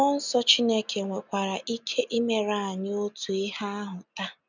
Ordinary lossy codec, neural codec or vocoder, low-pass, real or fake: none; none; 7.2 kHz; real